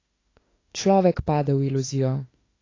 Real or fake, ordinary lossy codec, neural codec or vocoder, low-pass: fake; AAC, 32 kbps; autoencoder, 48 kHz, 32 numbers a frame, DAC-VAE, trained on Japanese speech; 7.2 kHz